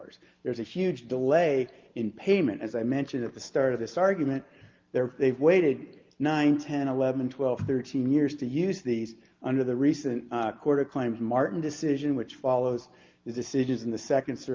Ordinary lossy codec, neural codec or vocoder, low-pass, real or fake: Opus, 32 kbps; none; 7.2 kHz; real